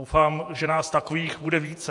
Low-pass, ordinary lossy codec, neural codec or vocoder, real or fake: 10.8 kHz; AAC, 64 kbps; vocoder, 48 kHz, 128 mel bands, Vocos; fake